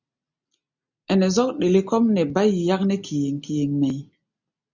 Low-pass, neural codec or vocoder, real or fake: 7.2 kHz; none; real